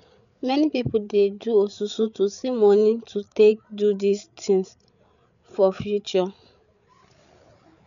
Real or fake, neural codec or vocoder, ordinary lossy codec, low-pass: fake; codec, 16 kHz, 8 kbps, FreqCodec, larger model; none; 7.2 kHz